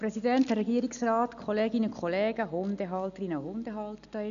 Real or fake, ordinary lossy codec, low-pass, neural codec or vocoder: real; none; 7.2 kHz; none